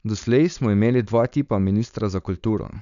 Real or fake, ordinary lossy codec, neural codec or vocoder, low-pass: fake; none; codec, 16 kHz, 4.8 kbps, FACodec; 7.2 kHz